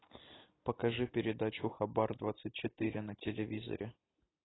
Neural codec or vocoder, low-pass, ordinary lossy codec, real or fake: none; 7.2 kHz; AAC, 16 kbps; real